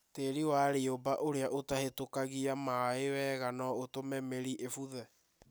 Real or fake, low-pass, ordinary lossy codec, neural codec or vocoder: real; none; none; none